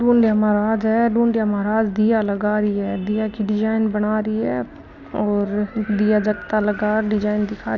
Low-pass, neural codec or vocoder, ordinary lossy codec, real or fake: 7.2 kHz; none; none; real